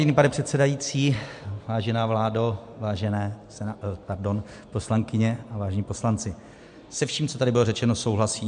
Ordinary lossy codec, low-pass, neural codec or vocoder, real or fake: MP3, 64 kbps; 9.9 kHz; none; real